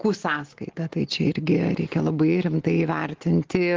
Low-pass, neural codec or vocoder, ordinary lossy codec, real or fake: 7.2 kHz; none; Opus, 16 kbps; real